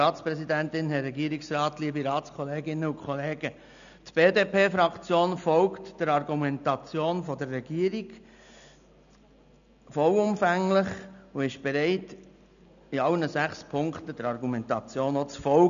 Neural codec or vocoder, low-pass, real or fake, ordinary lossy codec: none; 7.2 kHz; real; none